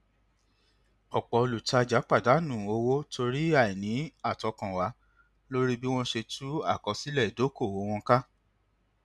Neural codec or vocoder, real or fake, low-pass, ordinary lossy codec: none; real; none; none